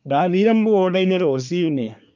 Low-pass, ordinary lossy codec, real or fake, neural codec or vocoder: 7.2 kHz; none; fake; codec, 24 kHz, 1 kbps, SNAC